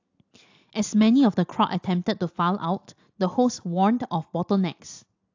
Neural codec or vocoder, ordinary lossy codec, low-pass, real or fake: none; AAC, 48 kbps; 7.2 kHz; real